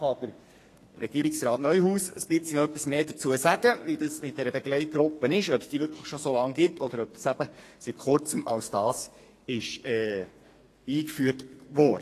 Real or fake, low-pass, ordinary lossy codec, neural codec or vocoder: fake; 14.4 kHz; AAC, 48 kbps; codec, 32 kHz, 1.9 kbps, SNAC